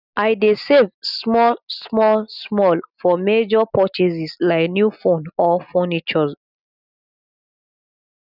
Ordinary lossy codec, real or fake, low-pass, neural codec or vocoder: none; real; 5.4 kHz; none